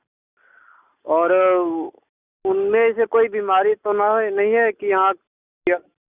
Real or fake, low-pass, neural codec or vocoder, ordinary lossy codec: real; 3.6 kHz; none; Opus, 64 kbps